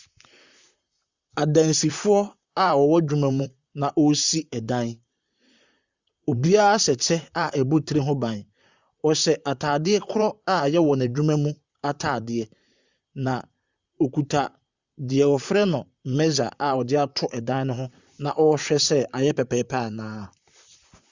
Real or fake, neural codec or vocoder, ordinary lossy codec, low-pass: fake; vocoder, 44.1 kHz, 128 mel bands, Pupu-Vocoder; Opus, 64 kbps; 7.2 kHz